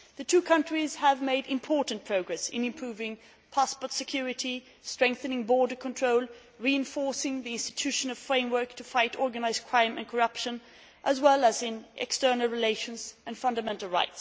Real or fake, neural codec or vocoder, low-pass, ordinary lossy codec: real; none; none; none